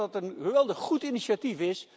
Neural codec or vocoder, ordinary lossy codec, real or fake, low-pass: none; none; real; none